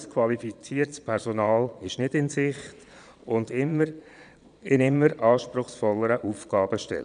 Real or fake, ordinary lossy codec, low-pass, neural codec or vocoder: fake; none; 9.9 kHz; vocoder, 22.05 kHz, 80 mel bands, Vocos